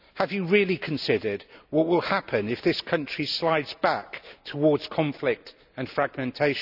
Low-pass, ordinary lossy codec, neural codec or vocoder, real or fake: 5.4 kHz; none; none; real